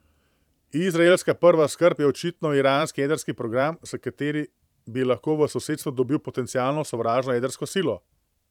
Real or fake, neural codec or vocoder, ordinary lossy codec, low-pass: fake; vocoder, 44.1 kHz, 128 mel bands every 512 samples, BigVGAN v2; none; 19.8 kHz